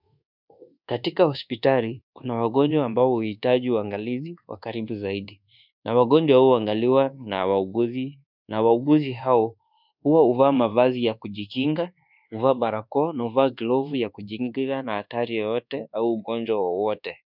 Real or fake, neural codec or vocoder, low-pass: fake; codec, 24 kHz, 1.2 kbps, DualCodec; 5.4 kHz